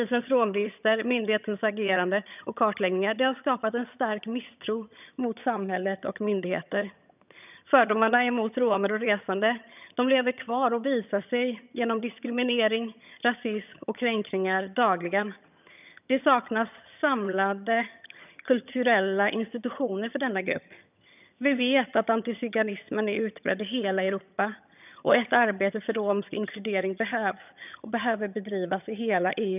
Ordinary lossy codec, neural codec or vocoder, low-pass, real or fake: none; vocoder, 22.05 kHz, 80 mel bands, HiFi-GAN; 3.6 kHz; fake